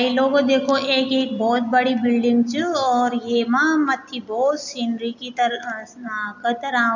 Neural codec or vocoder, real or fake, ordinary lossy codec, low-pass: none; real; none; 7.2 kHz